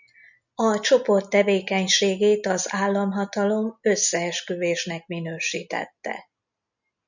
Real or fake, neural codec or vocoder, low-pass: real; none; 7.2 kHz